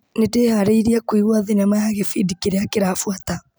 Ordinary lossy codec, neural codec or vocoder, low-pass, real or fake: none; none; none; real